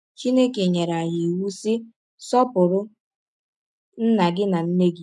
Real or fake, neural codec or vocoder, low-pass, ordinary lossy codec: real; none; none; none